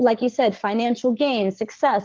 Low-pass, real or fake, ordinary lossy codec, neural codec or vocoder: 7.2 kHz; fake; Opus, 16 kbps; codec, 44.1 kHz, 7.8 kbps, Pupu-Codec